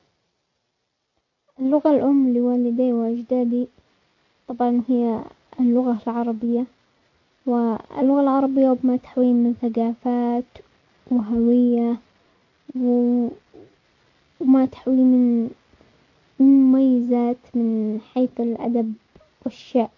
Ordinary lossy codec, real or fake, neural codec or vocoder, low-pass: AAC, 48 kbps; real; none; 7.2 kHz